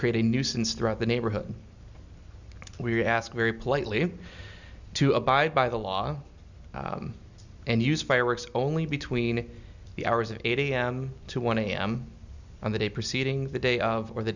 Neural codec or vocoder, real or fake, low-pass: none; real; 7.2 kHz